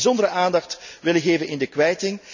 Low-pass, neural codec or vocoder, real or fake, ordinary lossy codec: 7.2 kHz; none; real; none